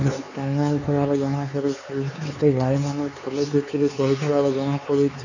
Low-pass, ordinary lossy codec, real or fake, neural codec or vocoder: 7.2 kHz; none; fake; codec, 16 kHz, 4 kbps, X-Codec, HuBERT features, trained on LibriSpeech